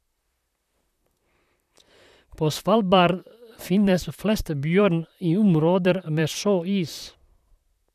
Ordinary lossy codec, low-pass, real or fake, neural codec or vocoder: none; 14.4 kHz; fake; vocoder, 44.1 kHz, 128 mel bands, Pupu-Vocoder